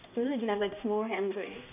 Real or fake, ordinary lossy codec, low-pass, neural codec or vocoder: fake; none; 3.6 kHz; codec, 16 kHz, 1 kbps, X-Codec, HuBERT features, trained on balanced general audio